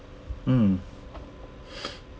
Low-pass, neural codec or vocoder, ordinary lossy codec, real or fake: none; none; none; real